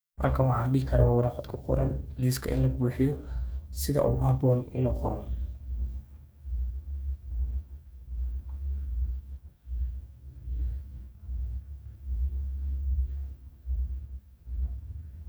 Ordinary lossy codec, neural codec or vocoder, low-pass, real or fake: none; codec, 44.1 kHz, 2.6 kbps, DAC; none; fake